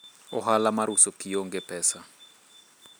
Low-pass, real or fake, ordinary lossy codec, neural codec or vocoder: none; real; none; none